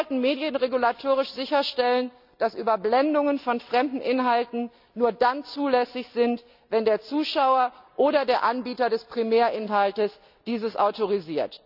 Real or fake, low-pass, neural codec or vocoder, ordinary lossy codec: real; 5.4 kHz; none; none